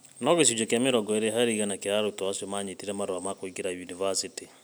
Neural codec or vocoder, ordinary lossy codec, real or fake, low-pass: none; none; real; none